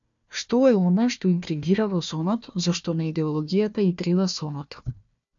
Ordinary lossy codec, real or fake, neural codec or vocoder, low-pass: MP3, 64 kbps; fake; codec, 16 kHz, 1 kbps, FunCodec, trained on Chinese and English, 50 frames a second; 7.2 kHz